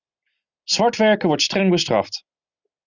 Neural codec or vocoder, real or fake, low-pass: none; real; 7.2 kHz